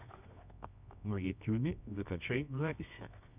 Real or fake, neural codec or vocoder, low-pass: fake; codec, 24 kHz, 0.9 kbps, WavTokenizer, medium music audio release; 3.6 kHz